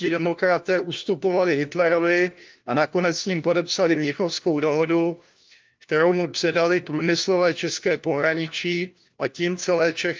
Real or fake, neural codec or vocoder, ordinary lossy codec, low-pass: fake; codec, 16 kHz, 1 kbps, FunCodec, trained on LibriTTS, 50 frames a second; Opus, 24 kbps; 7.2 kHz